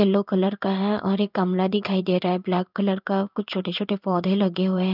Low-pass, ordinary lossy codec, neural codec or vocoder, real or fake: 5.4 kHz; none; codec, 16 kHz in and 24 kHz out, 1 kbps, XY-Tokenizer; fake